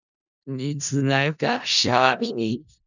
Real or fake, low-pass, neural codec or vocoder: fake; 7.2 kHz; codec, 16 kHz in and 24 kHz out, 0.4 kbps, LongCat-Audio-Codec, four codebook decoder